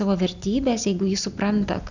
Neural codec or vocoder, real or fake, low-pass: none; real; 7.2 kHz